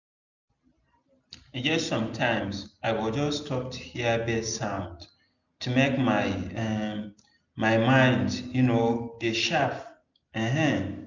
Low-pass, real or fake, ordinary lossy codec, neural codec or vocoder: 7.2 kHz; real; none; none